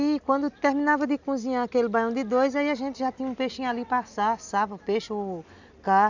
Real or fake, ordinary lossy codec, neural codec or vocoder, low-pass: real; none; none; 7.2 kHz